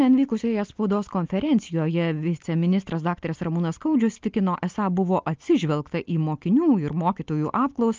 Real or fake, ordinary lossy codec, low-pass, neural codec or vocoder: real; Opus, 24 kbps; 7.2 kHz; none